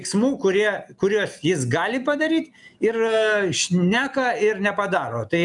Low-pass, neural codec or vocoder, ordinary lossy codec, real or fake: 10.8 kHz; none; MP3, 96 kbps; real